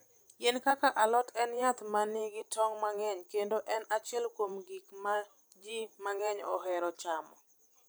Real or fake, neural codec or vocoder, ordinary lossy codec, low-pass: fake; vocoder, 44.1 kHz, 128 mel bands every 512 samples, BigVGAN v2; none; none